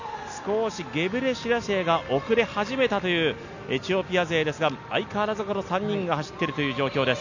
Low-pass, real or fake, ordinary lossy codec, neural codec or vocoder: 7.2 kHz; real; none; none